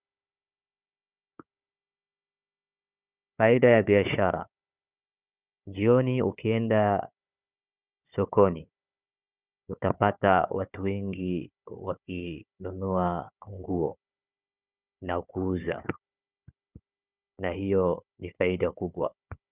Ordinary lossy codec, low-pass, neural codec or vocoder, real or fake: Opus, 64 kbps; 3.6 kHz; codec, 16 kHz, 4 kbps, FunCodec, trained on Chinese and English, 50 frames a second; fake